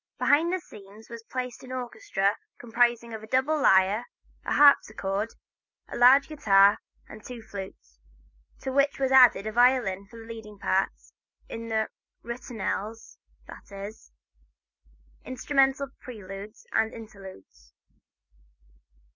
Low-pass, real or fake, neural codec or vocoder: 7.2 kHz; real; none